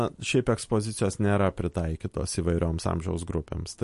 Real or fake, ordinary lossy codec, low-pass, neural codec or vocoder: real; MP3, 48 kbps; 14.4 kHz; none